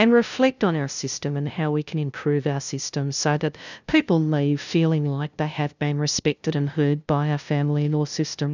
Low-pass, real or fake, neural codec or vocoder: 7.2 kHz; fake; codec, 16 kHz, 0.5 kbps, FunCodec, trained on LibriTTS, 25 frames a second